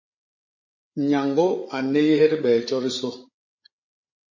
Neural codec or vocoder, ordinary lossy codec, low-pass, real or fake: codec, 16 kHz, 8 kbps, FreqCodec, larger model; MP3, 32 kbps; 7.2 kHz; fake